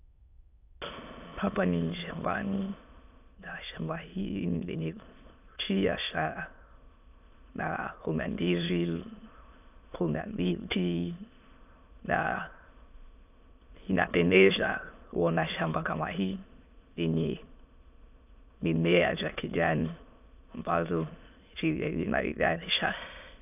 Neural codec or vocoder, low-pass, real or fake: autoencoder, 22.05 kHz, a latent of 192 numbers a frame, VITS, trained on many speakers; 3.6 kHz; fake